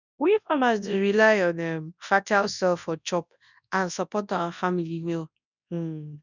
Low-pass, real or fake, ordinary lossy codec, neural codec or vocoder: 7.2 kHz; fake; none; codec, 24 kHz, 0.9 kbps, WavTokenizer, large speech release